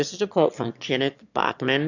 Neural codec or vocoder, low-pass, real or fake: autoencoder, 22.05 kHz, a latent of 192 numbers a frame, VITS, trained on one speaker; 7.2 kHz; fake